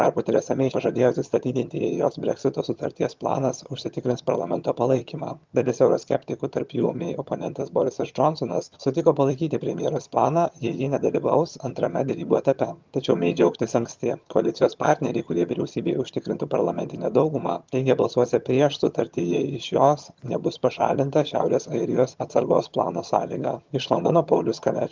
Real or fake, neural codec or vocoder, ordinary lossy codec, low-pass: fake; vocoder, 22.05 kHz, 80 mel bands, HiFi-GAN; Opus, 32 kbps; 7.2 kHz